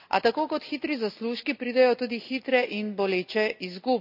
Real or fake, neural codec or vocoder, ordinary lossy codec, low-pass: real; none; none; 5.4 kHz